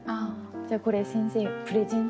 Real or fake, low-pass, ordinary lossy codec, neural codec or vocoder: real; none; none; none